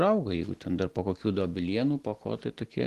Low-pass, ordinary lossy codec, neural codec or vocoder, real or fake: 14.4 kHz; Opus, 16 kbps; none; real